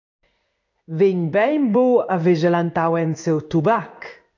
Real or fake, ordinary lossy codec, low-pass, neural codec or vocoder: fake; AAC, 48 kbps; 7.2 kHz; codec, 16 kHz in and 24 kHz out, 1 kbps, XY-Tokenizer